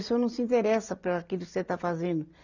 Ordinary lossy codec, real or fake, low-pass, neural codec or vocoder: none; real; 7.2 kHz; none